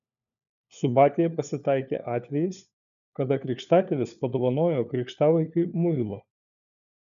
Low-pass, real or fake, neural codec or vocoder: 7.2 kHz; fake; codec, 16 kHz, 4 kbps, FunCodec, trained on LibriTTS, 50 frames a second